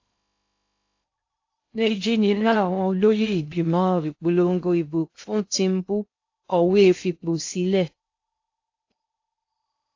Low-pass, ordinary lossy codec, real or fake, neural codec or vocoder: 7.2 kHz; AAC, 48 kbps; fake; codec, 16 kHz in and 24 kHz out, 0.6 kbps, FocalCodec, streaming, 2048 codes